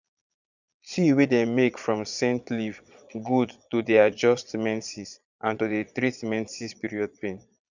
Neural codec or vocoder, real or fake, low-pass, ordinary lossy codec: vocoder, 22.05 kHz, 80 mel bands, Vocos; fake; 7.2 kHz; none